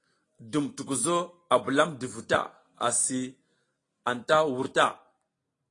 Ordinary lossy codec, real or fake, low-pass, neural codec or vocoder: AAC, 32 kbps; real; 10.8 kHz; none